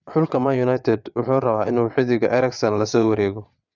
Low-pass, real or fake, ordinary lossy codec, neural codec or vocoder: 7.2 kHz; fake; none; vocoder, 22.05 kHz, 80 mel bands, WaveNeXt